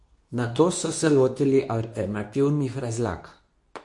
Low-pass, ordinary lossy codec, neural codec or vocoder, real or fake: 10.8 kHz; MP3, 48 kbps; codec, 24 kHz, 0.9 kbps, WavTokenizer, medium speech release version 2; fake